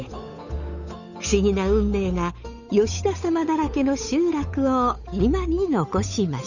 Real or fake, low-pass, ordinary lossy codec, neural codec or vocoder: fake; 7.2 kHz; none; codec, 16 kHz, 8 kbps, FunCodec, trained on Chinese and English, 25 frames a second